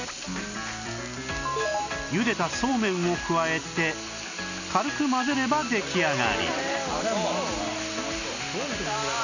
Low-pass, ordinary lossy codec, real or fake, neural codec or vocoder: 7.2 kHz; none; real; none